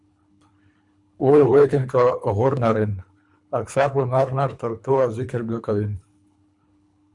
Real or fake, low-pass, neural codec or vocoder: fake; 10.8 kHz; codec, 24 kHz, 3 kbps, HILCodec